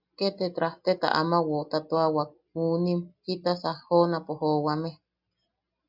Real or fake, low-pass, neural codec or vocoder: real; 5.4 kHz; none